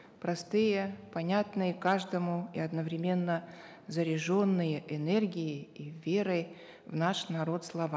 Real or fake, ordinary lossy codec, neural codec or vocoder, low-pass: real; none; none; none